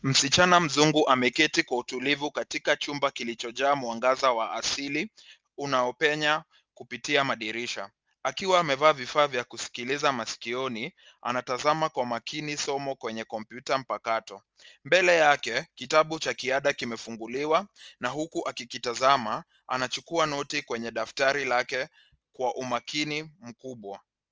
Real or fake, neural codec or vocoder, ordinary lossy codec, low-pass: real; none; Opus, 24 kbps; 7.2 kHz